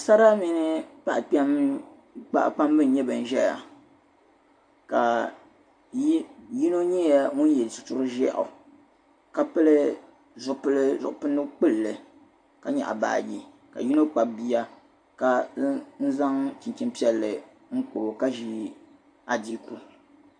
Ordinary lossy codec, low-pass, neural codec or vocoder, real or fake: AAC, 64 kbps; 9.9 kHz; none; real